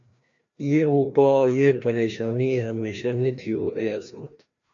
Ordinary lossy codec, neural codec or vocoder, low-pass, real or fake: AAC, 64 kbps; codec, 16 kHz, 1 kbps, FreqCodec, larger model; 7.2 kHz; fake